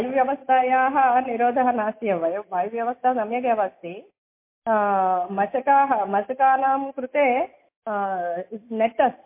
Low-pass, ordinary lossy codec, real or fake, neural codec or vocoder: 3.6 kHz; MP3, 24 kbps; real; none